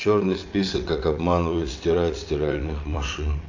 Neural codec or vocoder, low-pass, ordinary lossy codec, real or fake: vocoder, 22.05 kHz, 80 mel bands, WaveNeXt; 7.2 kHz; AAC, 48 kbps; fake